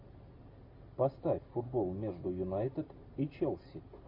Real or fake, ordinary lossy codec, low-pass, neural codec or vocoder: real; MP3, 32 kbps; 5.4 kHz; none